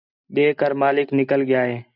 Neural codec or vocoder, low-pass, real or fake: none; 5.4 kHz; real